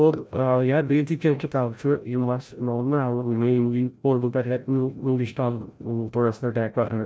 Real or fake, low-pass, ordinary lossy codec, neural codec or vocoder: fake; none; none; codec, 16 kHz, 0.5 kbps, FreqCodec, larger model